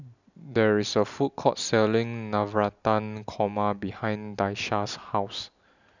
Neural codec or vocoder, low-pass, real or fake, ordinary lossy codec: none; 7.2 kHz; real; none